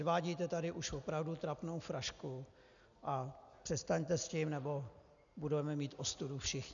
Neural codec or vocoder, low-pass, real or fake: none; 7.2 kHz; real